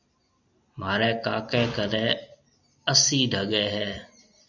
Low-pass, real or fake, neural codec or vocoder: 7.2 kHz; real; none